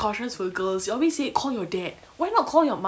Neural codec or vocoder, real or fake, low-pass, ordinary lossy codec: none; real; none; none